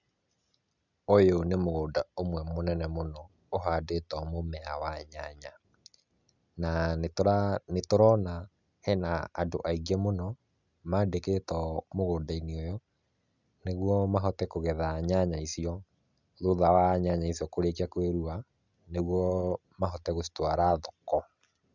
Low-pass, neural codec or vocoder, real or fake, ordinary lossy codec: 7.2 kHz; none; real; none